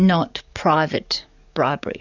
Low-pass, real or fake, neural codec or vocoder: 7.2 kHz; fake; codec, 44.1 kHz, 7.8 kbps, DAC